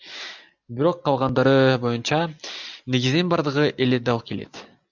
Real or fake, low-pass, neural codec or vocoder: real; 7.2 kHz; none